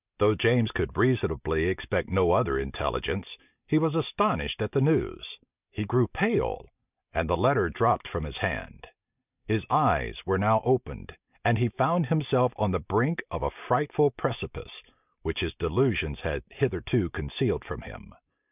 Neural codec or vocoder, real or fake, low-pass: none; real; 3.6 kHz